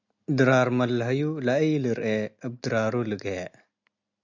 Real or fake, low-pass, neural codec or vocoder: real; 7.2 kHz; none